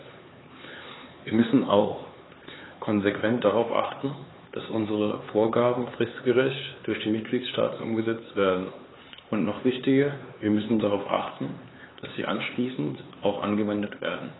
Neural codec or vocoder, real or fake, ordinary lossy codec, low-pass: codec, 16 kHz, 4 kbps, X-Codec, HuBERT features, trained on LibriSpeech; fake; AAC, 16 kbps; 7.2 kHz